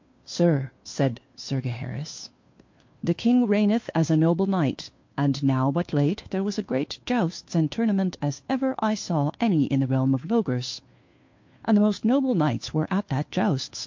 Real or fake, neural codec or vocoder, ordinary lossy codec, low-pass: fake; codec, 16 kHz, 2 kbps, FunCodec, trained on Chinese and English, 25 frames a second; MP3, 48 kbps; 7.2 kHz